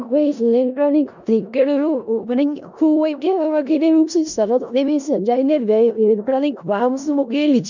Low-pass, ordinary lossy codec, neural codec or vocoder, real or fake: 7.2 kHz; none; codec, 16 kHz in and 24 kHz out, 0.4 kbps, LongCat-Audio-Codec, four codebook decoder; fake